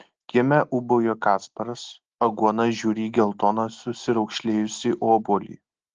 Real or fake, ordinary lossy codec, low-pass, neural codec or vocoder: real; Opus, 16 kbps; 7.2 kHz; none